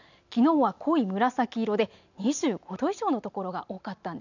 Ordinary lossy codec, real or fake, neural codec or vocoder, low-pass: none; real; none; 7.2 kHz